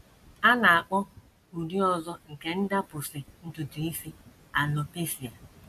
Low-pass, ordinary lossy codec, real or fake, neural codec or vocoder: 14.4 kHz; none; real; none